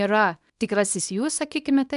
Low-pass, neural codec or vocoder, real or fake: 10.8 kHz; codec, 24 kHz, 0.9 kbps, WavTokenizer, small release; fake